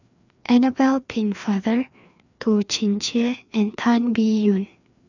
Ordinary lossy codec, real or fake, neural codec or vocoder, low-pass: none; fake; codec, 16 kHz, 2 kbps, FreqCodec, larger model; 7.2 kHz